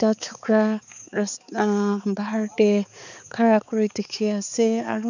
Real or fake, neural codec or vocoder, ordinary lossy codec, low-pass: fake; codec, 16 kHz, 4 kbps, X-Codec, HuBERT features, trained on balanced general audio; none; 7.2 kHz